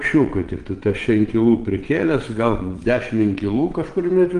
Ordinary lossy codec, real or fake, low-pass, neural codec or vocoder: Opus, 24 kbps; fake; 9.9 kHz; vocoder, 22.05 kHz, 80 mel bands, WaveNeXt